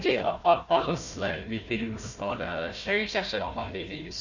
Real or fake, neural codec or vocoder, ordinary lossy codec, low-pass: fake; codec, 16 kHz, 1 kbps, FunCodec, trained on Chinese and English, 50 frames a second; none; 7.2 kHz